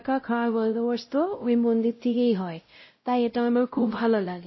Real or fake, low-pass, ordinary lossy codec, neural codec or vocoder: fake; 7.2 kHz; MP3, 24 kbps; codec, 16 kHz, 0.5 kbps, X-Codec, WavLM features, trained on Multilingual LibriSpeech